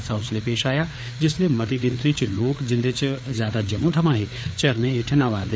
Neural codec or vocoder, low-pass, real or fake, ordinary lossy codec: codec, 16 kHz, 4 kbps, FreqCodec, larger model; none; fake; none